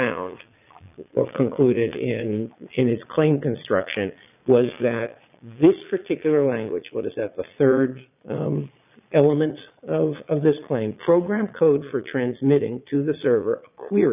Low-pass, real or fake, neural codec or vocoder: 3.6 kHz; fake; vocoder, 22.05 kHz, 80 mel bands, Vocos